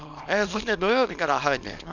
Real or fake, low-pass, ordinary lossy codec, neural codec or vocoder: fake; 7.2 kHz; none; codec, 24 kHz, 0.9 kbps, WavTokenizer, small release